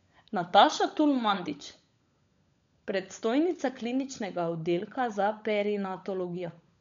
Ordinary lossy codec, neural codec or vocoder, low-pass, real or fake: MP3, 64 kbps; codec, 16 kHz, 16 kbps, FunCodec, trained on LibriTTS, 50 frames a second; 7.2 kHz; fake